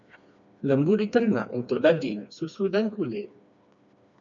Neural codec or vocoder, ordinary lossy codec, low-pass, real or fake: codec, 16 kHz, 2 kbps, FreqCodec, smaller model; MP3, 64 kbps; 7.2 kHz; fake